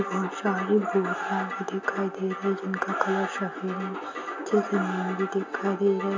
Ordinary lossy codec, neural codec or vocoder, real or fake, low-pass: AAC, 48 kbps; none; real; 7.2 kHz